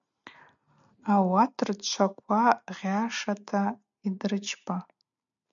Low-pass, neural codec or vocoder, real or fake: 7.2 kHz; none; real